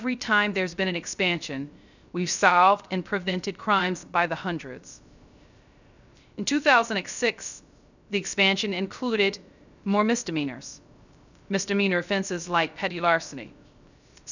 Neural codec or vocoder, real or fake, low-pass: codec, 16 kHz, 0.3 kbps, FocalCodec; fake; 7.2 kHz